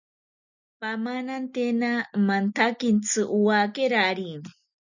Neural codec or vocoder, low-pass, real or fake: none; 7.2 kHz; real